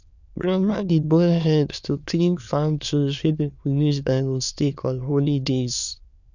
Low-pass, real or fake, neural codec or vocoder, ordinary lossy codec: 7.2 kHz; fake; autoencoder, 22.05 kHz, a latent of 192 numbers a frame, VITS, trained on many speakers; none